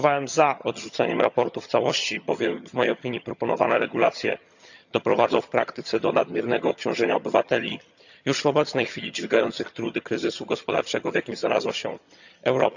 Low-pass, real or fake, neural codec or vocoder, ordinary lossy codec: 7.2 kHz; fake; vocoder, 22.05 kHz, 80 mel bands, HiFi-GAN; none